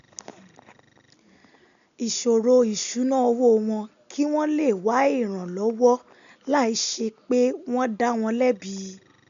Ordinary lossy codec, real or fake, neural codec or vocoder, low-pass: none; real; none; 7.2 kHz